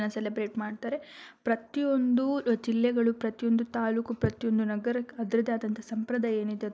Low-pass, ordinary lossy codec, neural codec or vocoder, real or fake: none; none; none; real